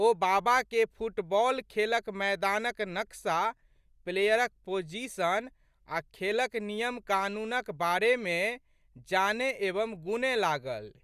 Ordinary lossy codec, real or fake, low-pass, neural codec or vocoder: Opus, 64 kbps; real; 14.4 kHz; none